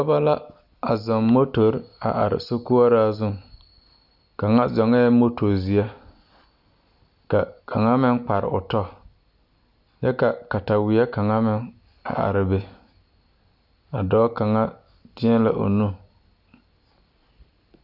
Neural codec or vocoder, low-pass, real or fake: none; 5.4 kHz; real